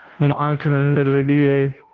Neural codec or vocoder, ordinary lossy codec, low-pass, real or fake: codec, 16 kHz, 0.5 kbps, FunCodec, trained on Chinese and English, 25 frames a second; Opus, 16 kbps; 7.2 kHz; fake